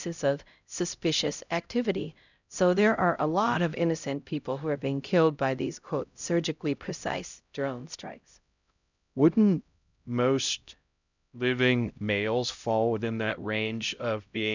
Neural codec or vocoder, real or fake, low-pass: codec, 16 kHz, 0.5 kbps, X-Codec, HuBERT features, trained on LibriSpeech; fake; 7.2 kHz